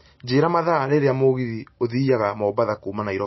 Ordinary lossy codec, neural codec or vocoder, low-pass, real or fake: MP3, 24 kbps; none; 7.2 kHz; real